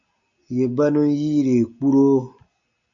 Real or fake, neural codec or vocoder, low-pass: real; none; 7.2 kHz